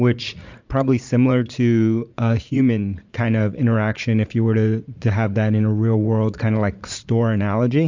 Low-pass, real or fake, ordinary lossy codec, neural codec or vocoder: 7.2 kHz; fake; MP3, 64 kbps; vocoder, 44.1 kHz, 80 mel bands, Vocos